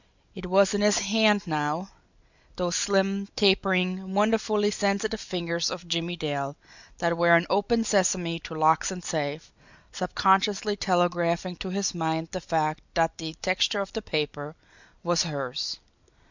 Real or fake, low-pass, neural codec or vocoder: real; 7.2 kHz; none